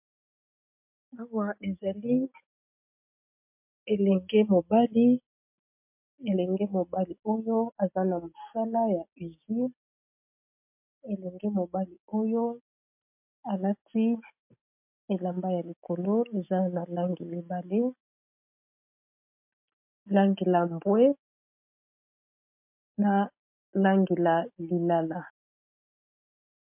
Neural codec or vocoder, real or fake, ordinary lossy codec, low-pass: none; real; MP3, 32 kbps; 3.6 kHz